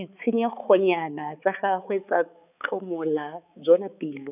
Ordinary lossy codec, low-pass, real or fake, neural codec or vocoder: none; 3.6 kHz; fake; codec, 16 kHz, 4 kbps, X-Codec, HuBERT features, trained on balanced general audio